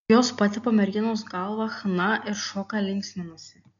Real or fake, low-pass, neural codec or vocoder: real; 7.2 kHz; none